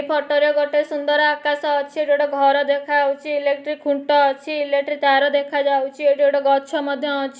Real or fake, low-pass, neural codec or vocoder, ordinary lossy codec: real; none; none; none